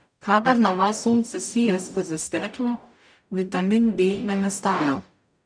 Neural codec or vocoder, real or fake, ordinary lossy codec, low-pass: codec, 44.1 kHz, 0.9 kbps, DAC; fake; none; 9.9 kHz